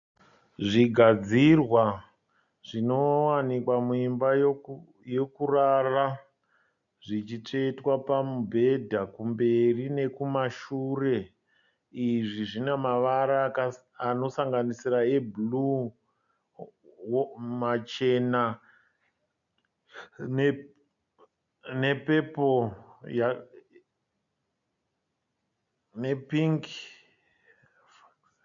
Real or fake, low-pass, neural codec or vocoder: real; 7.2 kHz; none